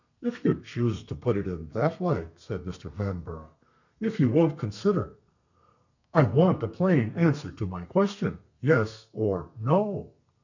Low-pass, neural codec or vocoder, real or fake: 7.2 kHz; codec, 32 kHz, 1.9 kbps, SNAC; fake